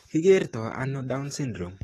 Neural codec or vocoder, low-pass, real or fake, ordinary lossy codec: codec, 44.1 kHz, 7.8 kbps, DAC; 19.8 kHz; fake; AAC, 32 kbps